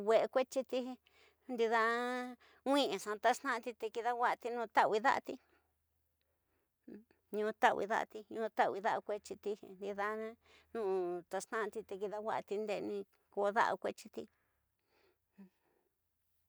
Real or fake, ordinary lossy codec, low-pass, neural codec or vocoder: real; none; none; none